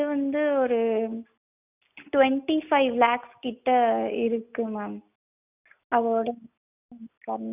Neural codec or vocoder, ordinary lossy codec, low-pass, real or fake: none; none; 3.6 kHz; real